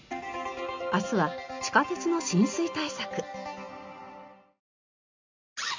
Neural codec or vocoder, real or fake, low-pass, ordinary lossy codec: none; real; 7.2 kHz; MP3, 64 kbps